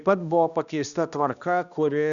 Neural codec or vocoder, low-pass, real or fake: codec, 16 kHz, 1 kbps, X-Codec, HuBERT features, trained on balanced general audio; 7.2 kHz; fake